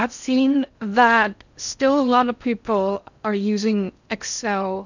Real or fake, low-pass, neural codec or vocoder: fake; 7.2 kHz; codec, 16 kHz in and 24 kHz out, 0.6 kbps, FocalCodec, streaming, 4096 codes